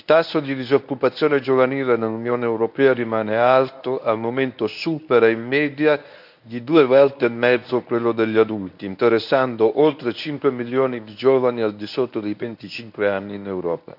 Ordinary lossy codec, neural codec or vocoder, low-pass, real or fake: none; codec, 24 kHz, 0.9 kbps, WavTokenizer, medium speech release version 1; 5.4 kHz; fake